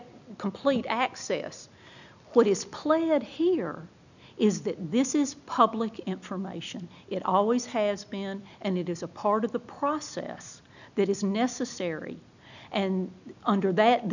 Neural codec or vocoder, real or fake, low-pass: none; real; 7.2 kHz